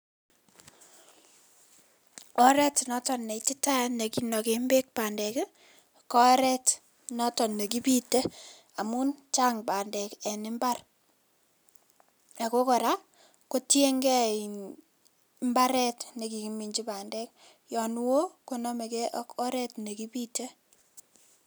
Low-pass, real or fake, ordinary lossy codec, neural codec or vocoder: none; real; none; none